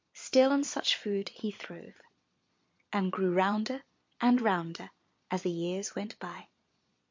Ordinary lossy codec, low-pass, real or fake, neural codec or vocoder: MP3, 48 kbps; 7.2 kHz; fake; vocoder, 22.05 kHz, 80 mel bands, Vocos